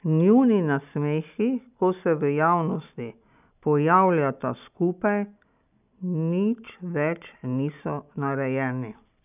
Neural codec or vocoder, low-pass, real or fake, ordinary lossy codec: none; 3.6 kHz; real; none